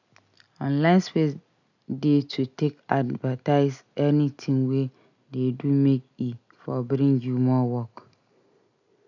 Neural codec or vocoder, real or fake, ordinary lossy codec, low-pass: none; real; none; 7.2 kHz